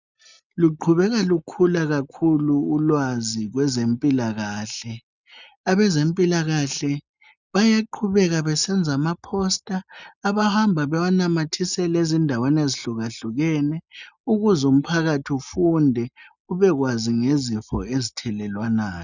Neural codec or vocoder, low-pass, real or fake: none; 7.2 kHz; real